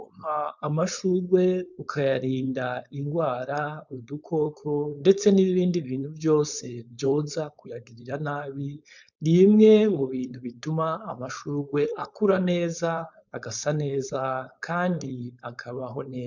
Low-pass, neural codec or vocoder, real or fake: 7.2 kHz; codec, 16 kHz, 4.8 kbps, FACodec; fake